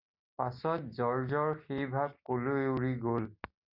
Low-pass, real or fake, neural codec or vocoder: 5.4 kHz; real; none